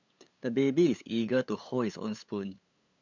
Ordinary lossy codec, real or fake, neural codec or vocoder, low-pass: MP3, 64 kbps; fake; codec, 16 kHz, 8 kbps, FunCodec, trained on LibriTTS, 25 frames a second; 7.2 kHz